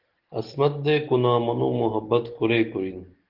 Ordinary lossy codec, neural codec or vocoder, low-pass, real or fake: Opus, 16 kbps; none; 5.4 kHz; real